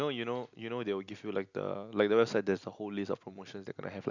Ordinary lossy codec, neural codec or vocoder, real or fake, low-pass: none; none; real; 7.2 kHz